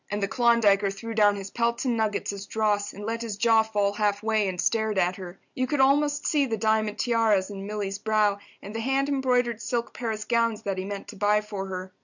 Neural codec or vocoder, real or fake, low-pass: none; real; 7.2 kHz